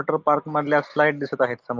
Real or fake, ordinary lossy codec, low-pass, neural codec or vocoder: real; Opus, 24 kbps; 7.2 kHz; none